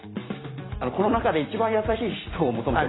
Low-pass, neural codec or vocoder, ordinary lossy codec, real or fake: 7.2 kHz; none; AAC, 16 kbps; real